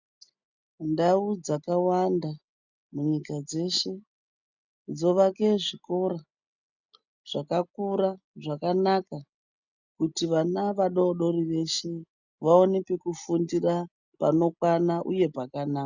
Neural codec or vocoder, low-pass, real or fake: none; 7.2 kHz; real